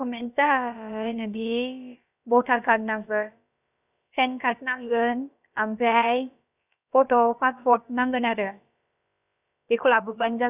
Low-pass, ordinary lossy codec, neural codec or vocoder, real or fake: 3.6 kHz; none; codec, 16 kHz, about 1 kbps, DyCAST, with the encoder's durations; fake